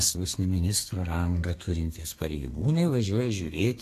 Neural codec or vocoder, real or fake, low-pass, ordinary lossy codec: codec, 32 kHz, 1.9 kbps, SNAC; fake; 14.4 kHz; AAC, 64 kbps